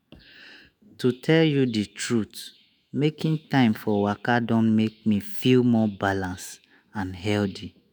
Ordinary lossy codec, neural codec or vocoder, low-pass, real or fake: none; autoencoder, 48 kHz, 128 numbers a frame, DAC-VAE, trained on Japanese speech; none; fake